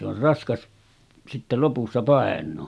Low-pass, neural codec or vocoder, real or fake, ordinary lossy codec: none; none; real; none